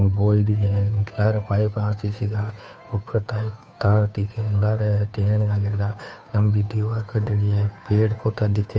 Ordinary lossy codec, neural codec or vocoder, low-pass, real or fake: none; codec, 16 kHz, 2 kbps, FunCodec, trained on Chinese and English, 25 frames a second; none; fake